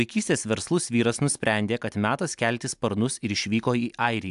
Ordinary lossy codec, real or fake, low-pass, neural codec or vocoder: AAC, 96 kbps; real; 10.8 kHz; none